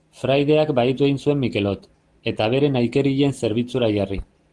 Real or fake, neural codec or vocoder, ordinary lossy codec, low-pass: real; none; Opus, 24 kbps; 10.8 kHz